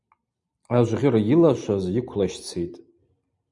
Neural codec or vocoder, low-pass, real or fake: vocoder, 44.1 kHz, 128 mel bands every 256 samples, BigVGAN v2; 10.8 kHz; fake